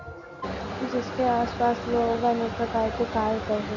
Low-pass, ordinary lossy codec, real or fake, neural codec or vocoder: 7.2 kHz; none; real; none